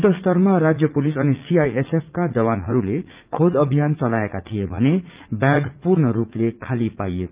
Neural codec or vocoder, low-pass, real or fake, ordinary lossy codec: vocoder, 44.1 kHz, 80 mel bands, Vocos; 3.6 kHz; fake; Opus, 24 kbps